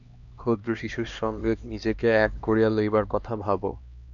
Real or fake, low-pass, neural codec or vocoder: fake; 7.2 kHz; codec, 16 kHz, 2 kbps, X-Codec, HuBERT features, trained on LibriSpeech